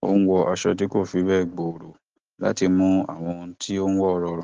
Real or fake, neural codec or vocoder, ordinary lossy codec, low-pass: real; none; Opus, 16 kbps; 7.2 kHz